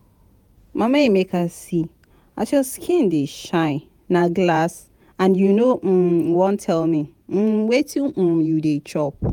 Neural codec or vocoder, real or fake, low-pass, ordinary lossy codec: vocoder, 48 kHz, 128 mel bands, Vocos; fake; 19.8 kHz; none